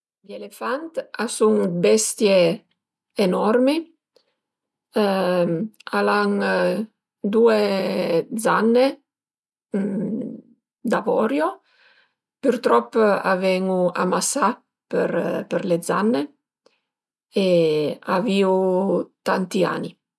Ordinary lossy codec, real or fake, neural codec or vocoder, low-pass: none; real; none; none